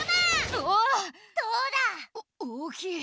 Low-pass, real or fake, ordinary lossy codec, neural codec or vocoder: none; real; none; none